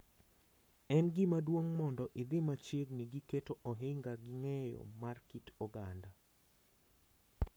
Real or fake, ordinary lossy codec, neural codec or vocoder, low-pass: fake; none; codec, 44.1 kHz, 7.8 kbps, Pupu-Codec; none